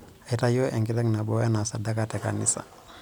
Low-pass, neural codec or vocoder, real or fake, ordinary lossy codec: none; none; real; none